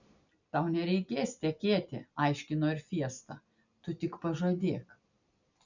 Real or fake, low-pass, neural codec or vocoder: real; 7.2 kHz; none